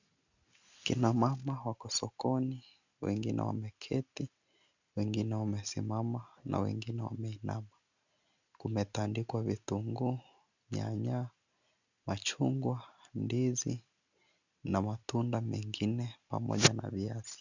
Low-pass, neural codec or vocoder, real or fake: 7.2 kHz; none; real